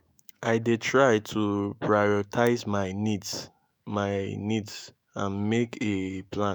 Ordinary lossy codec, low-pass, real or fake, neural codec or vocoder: none; none; fake; autoencoder, 48 kHz, 128 numbers a frame, DAC-VAE, trained on Japanese speech